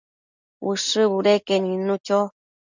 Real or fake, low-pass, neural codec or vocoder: real; 7.2 kHz; none